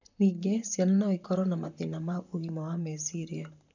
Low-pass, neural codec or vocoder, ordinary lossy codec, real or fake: 7.2 kHz; vocoder, 44.1 kHz, 128 mel bands, Pupu-Vocoder; none; fake